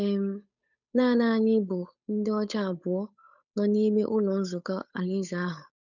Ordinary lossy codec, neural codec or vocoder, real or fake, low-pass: none; codec, 16 kHz, 8 kbps, FunCodec, trained on Chinese and English, 25 frames a second; fake; 7.2 kHz